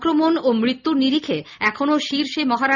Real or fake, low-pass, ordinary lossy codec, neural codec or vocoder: real; 7.2 kHz; none; none